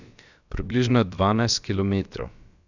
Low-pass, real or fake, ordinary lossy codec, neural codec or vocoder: 7.2 kHz; fake; none; codec, 16 kHz, about 1 kbps, DyCAST, with the encoder's durations